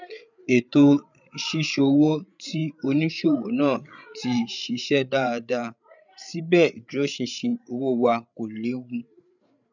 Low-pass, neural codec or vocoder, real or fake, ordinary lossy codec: 7.2 kHz; codec, 16 kHz, 8 kbps, FreqCodec, larger model; fake; none